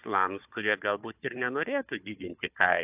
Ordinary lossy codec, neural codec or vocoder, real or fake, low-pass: AAC, 32 kbps; codec, 16 kHz, 4 kbps, FunCodec, trained on Chinese and English, 50 frames a second; fake; 3.6 kHz